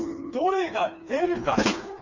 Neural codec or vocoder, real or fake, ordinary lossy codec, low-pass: codec, 16 kHz, 2 kbps, FreqCodec, smaller model; fake; Opus, 64 kbps; 7.2 kHz